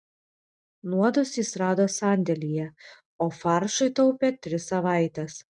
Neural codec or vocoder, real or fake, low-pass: none; real; 10.8 kHz